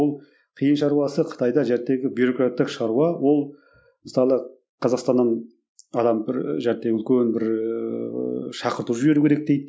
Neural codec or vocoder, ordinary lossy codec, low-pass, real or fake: none; none; none; real